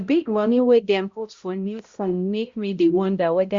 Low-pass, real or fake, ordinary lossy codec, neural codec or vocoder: 7.2 kHz; fake; Opus, 64 kbps; codec, 16 kHz, 0.5 kbps, X-Codec, HuBERT features, trained on balanced general audio